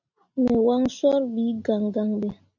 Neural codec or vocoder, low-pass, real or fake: none; 7.2 kHz; real